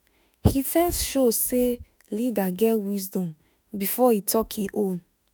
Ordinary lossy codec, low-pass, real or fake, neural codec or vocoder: none; none; fake; autoencoder, 48 kHz, 32 numbers a frame, DAC-VAE, trained on Japanese speech